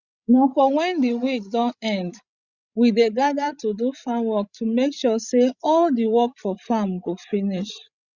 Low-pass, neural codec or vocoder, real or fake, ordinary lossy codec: 7.2 kHz; codec, 16 kHz, 16 kbps, FreqCodec, larger model; fake; Opus, 64 kbps